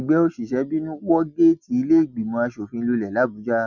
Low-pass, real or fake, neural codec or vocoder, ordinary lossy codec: 7.2 kHz; real; none; none